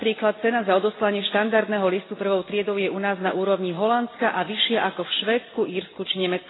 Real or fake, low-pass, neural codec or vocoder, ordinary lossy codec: real; 7.2 kHz; none; AAC, 16 kbps